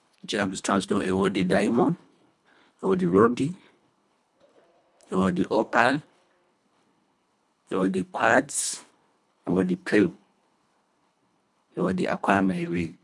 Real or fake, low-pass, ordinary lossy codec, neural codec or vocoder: fake; none; none; codec, 24 kHz, 1.5 kbps, HILCodec